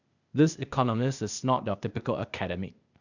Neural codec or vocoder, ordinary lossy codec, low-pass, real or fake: codec, 16 kHz, 0.8 kbps, ZipCodec; none; 7.2 kHz; fake